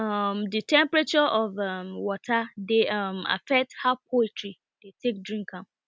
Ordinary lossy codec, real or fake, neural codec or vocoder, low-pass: none; real; none; none